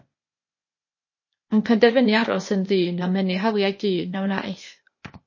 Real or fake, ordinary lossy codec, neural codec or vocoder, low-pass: fake; MP3, 32 kbps; codec, 16 kHz, 0.8 kbps, ZipCodec; 7.2 kHz